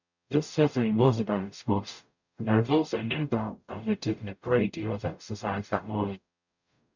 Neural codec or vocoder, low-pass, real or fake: codec, 44.1 kHz, 0.9 kbps, DAC; 7.2 kHz; fake